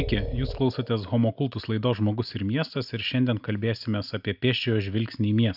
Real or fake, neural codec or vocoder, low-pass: real; none; 5.4 kHz